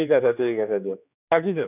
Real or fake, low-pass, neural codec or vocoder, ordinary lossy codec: fake; 3.6 kHz; codec, 16 kHz, 2 kbps, X-Codec, HuBERT features, trained on general audio; none